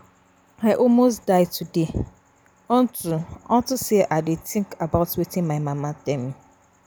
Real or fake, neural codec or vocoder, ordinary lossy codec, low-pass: real; none; none; none